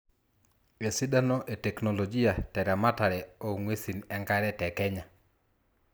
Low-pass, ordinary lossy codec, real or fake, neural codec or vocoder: none; none; real; none